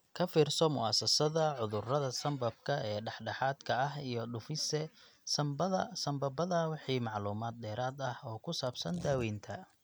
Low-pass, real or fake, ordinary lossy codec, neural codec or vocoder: none; real; none; none